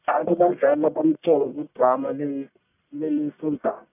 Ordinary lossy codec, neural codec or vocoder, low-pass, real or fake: none; codec, 44.1 kHz, 1.7 kbps, Pupu-Codec; 3.6 kHz; fake